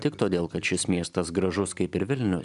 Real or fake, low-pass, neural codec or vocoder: real; 10.8 kHz; none